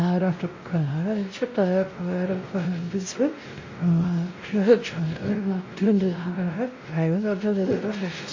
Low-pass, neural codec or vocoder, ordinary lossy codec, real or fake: 7.2 kHz; codec, 16 kHz, 0.5 kbps, X-Codec, WavLM features, trained on Multilingual LibriSpeech; MP3, 32 kbps; fake